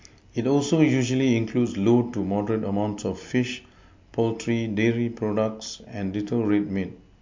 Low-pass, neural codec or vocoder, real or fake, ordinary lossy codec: 7.2 kHz; none; real; MP3, 48 kbps